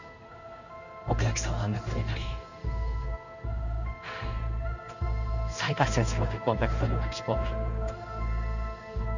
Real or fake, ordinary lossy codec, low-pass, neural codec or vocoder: fake; none; 7.2 kHz; codec, 16 kHz in and 24 kHz out, 1 kbps, XY-Tokenizer